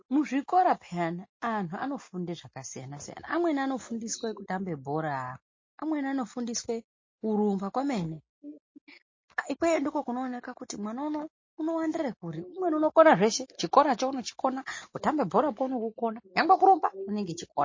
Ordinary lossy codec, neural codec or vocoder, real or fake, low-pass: MP3, 32 kbps; none; real; 7.2 kHz